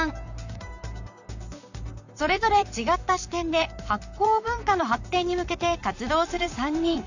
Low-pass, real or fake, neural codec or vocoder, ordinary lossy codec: 7.2 kHz; fake; vocoder, 44.1 kHz, 128 mel bands, Pupu-Vocoder; none